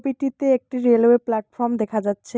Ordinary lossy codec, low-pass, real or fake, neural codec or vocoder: none; none; real; none